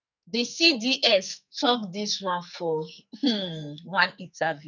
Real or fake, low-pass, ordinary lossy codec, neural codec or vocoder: fake; 7.2 kHz; none; codec, 44.1 kHz, 2.6 kbps, SNAC